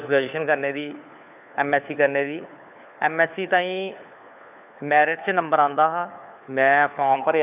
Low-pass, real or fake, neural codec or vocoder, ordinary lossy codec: 3.6 kHz; fake; codec, 16 kHz, 4 kbps, FunCodec, trained on LibriTTS, 50 frames a second; AAC, 32 kbps